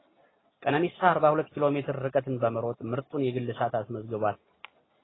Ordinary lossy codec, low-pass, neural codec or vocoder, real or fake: AAC, 16 kbps; 7.2 kHz; none; real